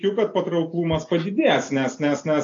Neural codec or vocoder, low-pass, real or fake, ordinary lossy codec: none; 7.2 kHz; real; AAC, 48 kbps